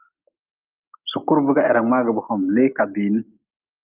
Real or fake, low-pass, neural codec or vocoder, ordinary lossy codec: real; 3.6 kHz; none; Opus, 24 kbps